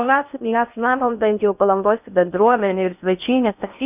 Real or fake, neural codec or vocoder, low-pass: fake; codec, 16 kHz in and 24 kHz out, 0.6 kbps, FocalCodec, streaming, 4096 codes; 3.6 kHz